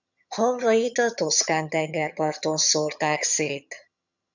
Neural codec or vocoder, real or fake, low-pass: vocoder, 22.05 kHz, 80 mel bands, HiFi-GAN; fake; 7.2 kHz